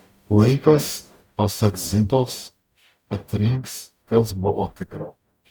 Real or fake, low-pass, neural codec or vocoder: fake; 19.8 kHz; codec, 44.1 kHz, 0.9 kbps, DAC